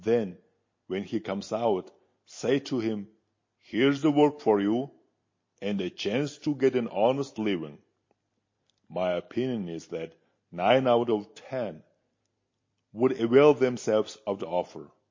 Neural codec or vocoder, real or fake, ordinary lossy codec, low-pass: none; real; MP3, 32 kbps; 7.2 kHz